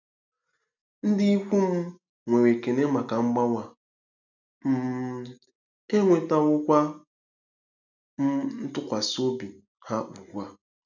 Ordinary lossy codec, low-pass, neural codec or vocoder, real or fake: none; 7.2 kHz; none; real